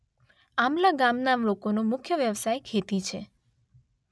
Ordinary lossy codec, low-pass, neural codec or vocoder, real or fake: none; none; none; real